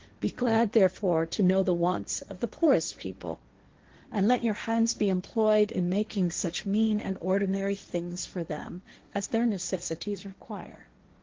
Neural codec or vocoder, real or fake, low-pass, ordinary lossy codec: codec, 16 kHz, 1.1 kbps, Voila-Tokenizer; fake; 7.2 kHz; Opus, 16 kbps